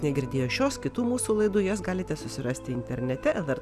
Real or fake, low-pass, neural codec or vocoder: real; 14.4 kHz; none